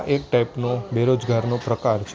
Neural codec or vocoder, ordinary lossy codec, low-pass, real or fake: none; none; none; real